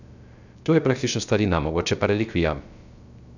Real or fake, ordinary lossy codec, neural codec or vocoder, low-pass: fake; none; codec, 16 kHz, 0.3 kbps, FocalCodec; 7.2 kHz